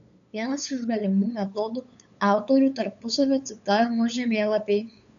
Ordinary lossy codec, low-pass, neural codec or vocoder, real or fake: AAC, 96 kbps; 7.2 kHz; codec, 16 kHz, 8 kbps, FunCodec, trained on LibriTTS, 25 frames a second; fake